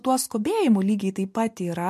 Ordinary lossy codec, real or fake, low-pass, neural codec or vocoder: MP3, 64 kbps; real; 14.4 kHz; none